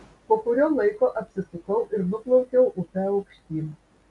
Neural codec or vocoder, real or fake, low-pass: none; real; 10.8 kHz